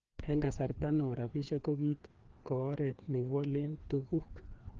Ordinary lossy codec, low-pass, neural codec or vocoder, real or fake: Opus, 16 kbps; 7.2 kHz; codec, 16 kHz, 2 kbps, FreqCodec, larger model; fake